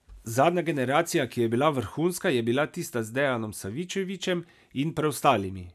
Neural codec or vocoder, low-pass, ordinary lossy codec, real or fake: none; 14.4 kHz; none; real